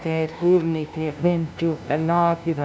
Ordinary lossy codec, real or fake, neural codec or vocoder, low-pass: none; fake; codec, 16 kHz, 0.5 kbps, FunCodec, trained on LibriTTS, 25 frames a second; none